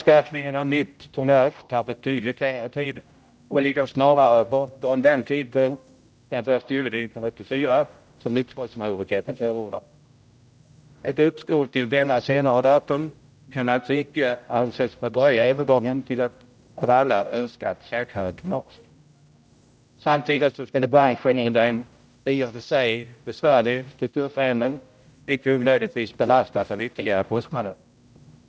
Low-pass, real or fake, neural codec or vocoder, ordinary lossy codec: none; fake; codec, 16 kHz, 0.5 kbps, X-Codec, HuBERT features, trained on general audio; none